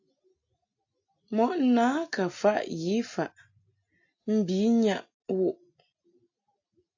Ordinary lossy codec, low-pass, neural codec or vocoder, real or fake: AAC, 48 kbps; 7.2 kHz; none; real